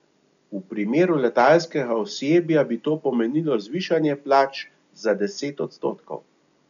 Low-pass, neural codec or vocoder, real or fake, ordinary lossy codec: 7.2 kHz; none; real; none